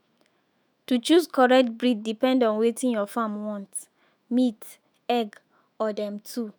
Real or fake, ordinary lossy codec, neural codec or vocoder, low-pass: fake; none; autoencoder, 48 kHz, 128 numbers a frame, DAC-VAE, trained on Japanese speech; none